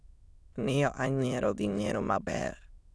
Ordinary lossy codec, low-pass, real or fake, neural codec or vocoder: none; none; fake; autoencoder, 22.05 kHz, a latent of 192 numbers a frame, VITS, trained on many speakers